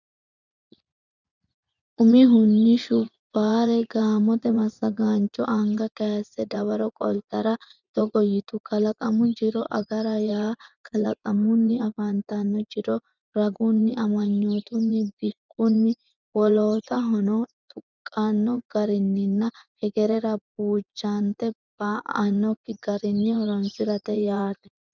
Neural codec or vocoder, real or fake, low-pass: vocoder, 24 kHz, 100 mel bands, Vocos; fake; 7.2 kHz